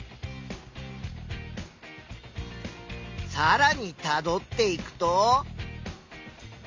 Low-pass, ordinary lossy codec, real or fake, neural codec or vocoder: 7.2 kHz; MP3, 64 kbps; real; none